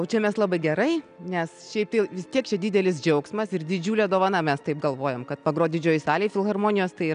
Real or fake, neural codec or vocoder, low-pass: real; none; 9.9 kHz